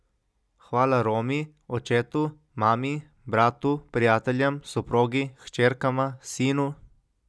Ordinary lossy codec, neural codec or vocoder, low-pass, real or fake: none; none; none; real